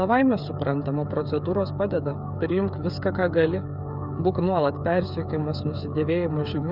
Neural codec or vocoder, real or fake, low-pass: codec, 16 kHz, 8 kbps, FreqCodec, smaller model; fake; 5.4 kHz